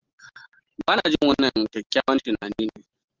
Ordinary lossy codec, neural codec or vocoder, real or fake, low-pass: Opus, 16 kbps; none; real; 7.2 kHz